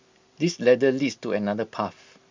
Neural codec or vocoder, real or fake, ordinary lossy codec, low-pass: none; real; MP3, 64 kbps; 7.2 kHz